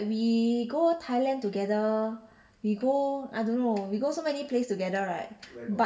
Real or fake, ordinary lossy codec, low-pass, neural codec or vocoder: real; none; none; none